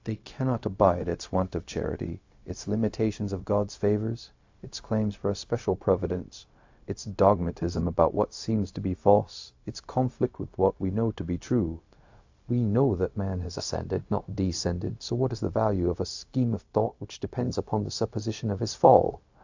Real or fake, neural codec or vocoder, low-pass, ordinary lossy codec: fake; codec, 16 kHz, 0.4 kbps, LongCat-Audio-Codec; 7.2 kHz; AAC, 48 kbps